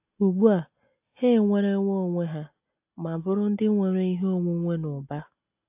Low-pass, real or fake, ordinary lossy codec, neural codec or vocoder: 3.6 kHz; real; AAC, 32 kbps; none